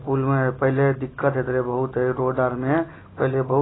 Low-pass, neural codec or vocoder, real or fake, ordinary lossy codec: 7.2 kHz; none; real; AAC, 16 kbps